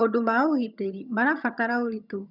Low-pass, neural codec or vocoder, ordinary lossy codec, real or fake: 5.4 kHz; vocoder, 22.05 kHz, 80 mel bands, HiFi-GAN; none; fake